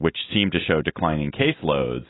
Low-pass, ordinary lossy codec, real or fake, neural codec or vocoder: 7.2 kHz; AAC, 16 kbps; real; none